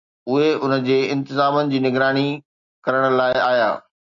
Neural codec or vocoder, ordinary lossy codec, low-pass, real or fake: none; MP3, 96 kbps; 7.2 kHz; real